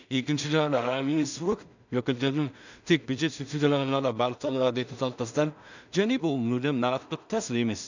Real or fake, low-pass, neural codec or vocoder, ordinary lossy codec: fake; 7.2 kHz; codec, 16 kHz in and 24 kHz out, 0.4 kbps, LongCat-Audio-Codec, two codebook decoder; none